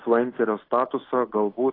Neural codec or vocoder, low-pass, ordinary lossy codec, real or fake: none; 5.4 kHz; AAC, 24 kbps; real